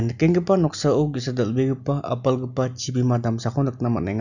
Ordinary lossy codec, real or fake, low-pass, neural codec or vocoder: none; real; 7.2 kHz; none